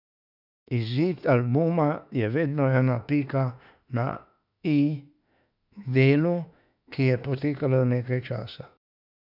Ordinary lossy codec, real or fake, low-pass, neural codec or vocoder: none; fake; 5.4 kHz; autoencoder, 48 kHz, 32 numbers a frame, DAC-VAE, trained on Japanese speech